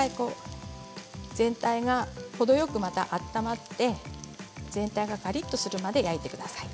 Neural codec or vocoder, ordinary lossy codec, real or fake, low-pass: none; none; real; none